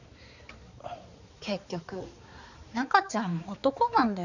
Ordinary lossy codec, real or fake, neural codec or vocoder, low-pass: none; fake; codec, 16 kHz, 4 kbps, X-Codec, HuBERT features, trained on balanced general audio; 7.2 kHz